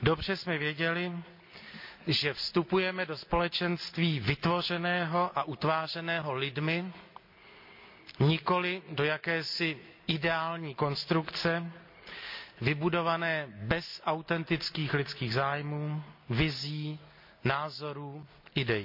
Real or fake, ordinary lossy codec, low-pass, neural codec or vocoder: real; MP3, 48 kbps; 5.4 kHz; none